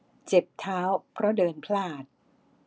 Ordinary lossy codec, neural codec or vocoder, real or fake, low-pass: none; none; real; none